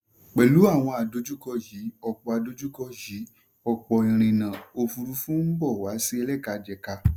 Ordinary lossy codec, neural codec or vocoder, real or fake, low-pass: none; none; real; none